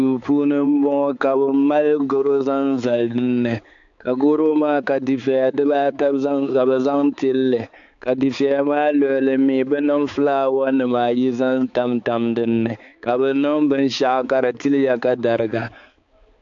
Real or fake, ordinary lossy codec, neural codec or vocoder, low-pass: fake; AAC, 64 kbps; codec, 16 kHz, 4 kbps, X-Codec, HuBERT features, trained on balanced general audio; 7.2 kHz